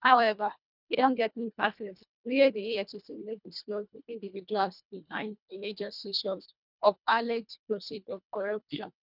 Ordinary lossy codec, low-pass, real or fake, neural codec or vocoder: none; 5.4 kHz; fake; codec, 24 kHz, 1.5 kbps, HILCodec